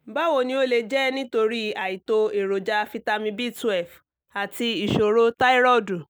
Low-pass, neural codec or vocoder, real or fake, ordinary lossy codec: none; none; real; none